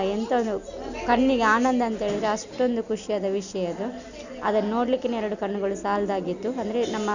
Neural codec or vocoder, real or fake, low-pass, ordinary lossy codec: none; real; 7.2 kHz; none